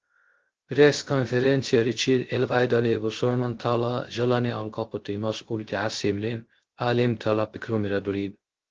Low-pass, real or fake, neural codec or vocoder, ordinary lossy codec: 7.2 kHz; fake; codec, 16 kHz, 0.3 kbps, FocalCodec; Opus, 16 kbps